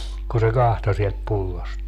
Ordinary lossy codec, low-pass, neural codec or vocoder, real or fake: none; 14.4 kHz; none; real